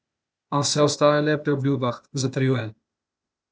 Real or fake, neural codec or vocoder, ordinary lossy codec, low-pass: fake; codec, 16 kHz, 0.8 kbps, ZipCodec; none; none